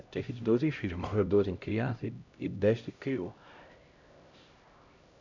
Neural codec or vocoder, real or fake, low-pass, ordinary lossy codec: codec, 16 kHz, 0.5 kbps, X-Codec, HuBERT features, trained on LibriSpeech; fake; 7.2 kHz; none